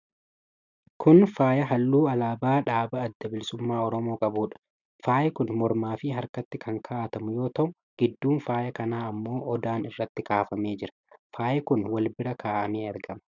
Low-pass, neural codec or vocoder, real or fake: 7.2 kHz; none; real